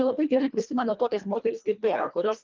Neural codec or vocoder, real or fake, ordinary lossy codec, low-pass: codec, 24 kHz, 1.5 kbps, HILCodec; fake; Opus, 24 kbps; 7.2 kHz